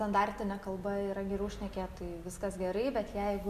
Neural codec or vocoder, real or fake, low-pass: none; real; 14.4 kHz